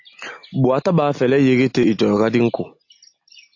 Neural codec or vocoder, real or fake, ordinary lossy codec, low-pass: none; real; AAC, 48 kbps; 7.2 kHz